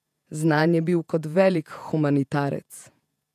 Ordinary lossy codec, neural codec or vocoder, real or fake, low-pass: none; vocoder, 48 kHz, 128 mel bands, Vocos; fake; 14.4 kHz